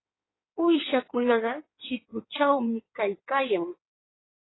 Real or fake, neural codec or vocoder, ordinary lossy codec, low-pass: fake; codec, 16 kHz in and 24 kHz out, 1.1 kbps, FireRedTTS-2 codec; AAC, 16 kbps; 7.2 kHz